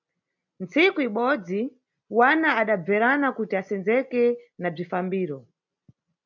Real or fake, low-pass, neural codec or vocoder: real; 7.2 kHz; none